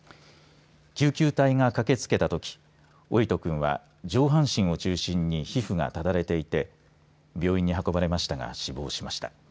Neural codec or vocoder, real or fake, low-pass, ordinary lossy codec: none; real; none; none